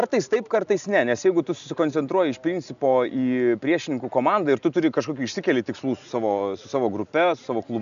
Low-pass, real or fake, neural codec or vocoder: 7.2 kHz; real; none